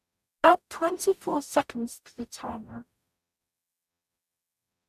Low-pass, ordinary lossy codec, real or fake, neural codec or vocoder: 14.4 kHz; AAC, 96 kbps; fake; codec, 44.1 kHz, 0.9 kbps, DAC